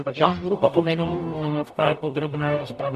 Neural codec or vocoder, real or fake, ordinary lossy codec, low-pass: codec, 44.1 kHz, 0.9 kbps, DAC; fake; MP3, 64 kbps; 14.4 kHz